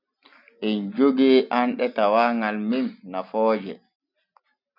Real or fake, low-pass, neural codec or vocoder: real; 5.4 kHz; none